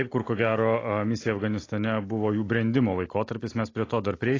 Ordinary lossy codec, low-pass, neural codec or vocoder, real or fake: AAC, 32 kbps; 7.2 kHz; none; real